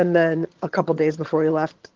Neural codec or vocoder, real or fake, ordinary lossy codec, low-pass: vocoder, 22.05 kHz, 80 mel bands, HiFi-GAN; fake; Opus, 16 kbps; 7.2 kHz